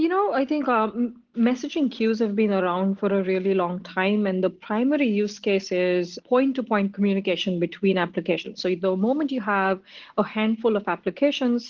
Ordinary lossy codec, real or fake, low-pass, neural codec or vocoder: Opus, 16 kbps; real; 7.2 kHz; none